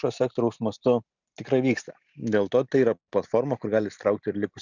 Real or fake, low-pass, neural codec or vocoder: real; 7.2 kHz; none